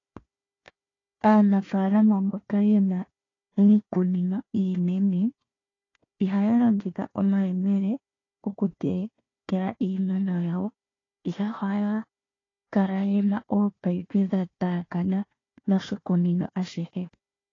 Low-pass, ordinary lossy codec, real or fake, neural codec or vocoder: 7.2 kHz; AAC, 32 kbps; fake; codec, 16 kHz, 1 kbps, FunCodec, trained on Chinese and English, 50 frames a second